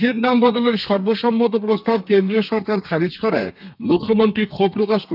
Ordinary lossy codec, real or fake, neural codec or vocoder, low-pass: none; fake; codec, 32 kHz, 1.9 kbps, SNAC; 5.4 kHz